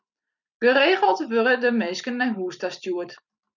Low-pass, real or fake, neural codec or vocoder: 7.2 kHz; fake; vocoder, 44.1 kHz, 128 mel bands every 256 samples, BigVGAN v2